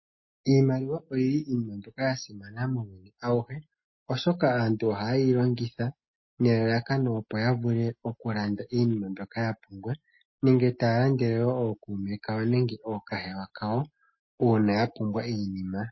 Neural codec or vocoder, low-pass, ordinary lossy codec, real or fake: none; 7.2 kHz; MP3, 24 kbps; real